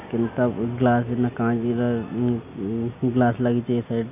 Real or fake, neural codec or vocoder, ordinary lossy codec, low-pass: real; none; none; 3.6 kHz